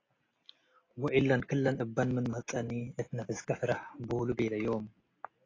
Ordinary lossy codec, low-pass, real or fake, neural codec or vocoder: AAC, 32 kbps; 7.2 kHz; real; none